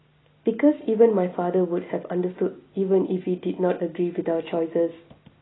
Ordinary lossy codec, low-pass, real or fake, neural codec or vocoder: AAC, 16 kbps; 7.2 kHz; real; none